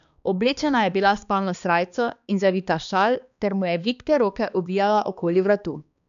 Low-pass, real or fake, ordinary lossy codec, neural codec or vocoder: 7.2 kHz; fake; none; codec, 16 kHz, 2 kbps, X-Codec, HuBERT features, trained on balanced general audio